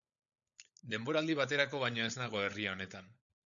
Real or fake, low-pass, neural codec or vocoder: fake; 7.2 kHz; codec, 16 kHz, 16 kbps, FunCodec, trained on LibriTTS, 50 frames a second